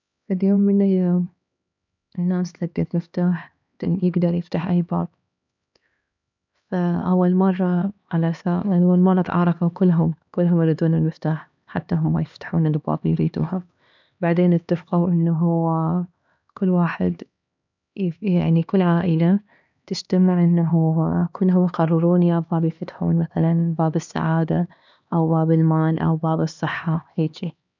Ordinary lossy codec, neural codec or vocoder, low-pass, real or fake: none; codec, 16 kHz, 2 kbps, X-Codec, HuBERT features, trained on LibriSpeech; 7.2 kHz; fake